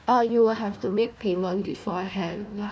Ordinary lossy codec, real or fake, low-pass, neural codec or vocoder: none; fake; none; codec, 16 kHz, 1 kbps, FunCodec, trained on Chinese and English, 50 frames a second